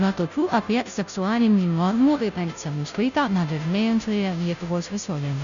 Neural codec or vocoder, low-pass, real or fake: codec, 16 kHz, 0.5 kbps, FunCodec, trained on Chinese and English, 25 frames a second; 7.2 kHz; fake